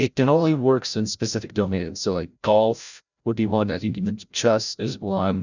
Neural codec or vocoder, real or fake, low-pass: codec, 16 kHz, 0.5 kbps, FreqCodec, larger model; fake; 7.2 kHz